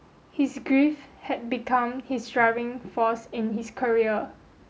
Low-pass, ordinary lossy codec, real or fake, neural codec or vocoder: none; none; real; none